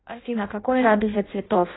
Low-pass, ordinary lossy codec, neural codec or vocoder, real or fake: 7.2 kHz; AAC, 16 kbps; codec, 16 kHz in and 24 kHz out, 0.6 kbps, FireRedTTS-2 codec; fake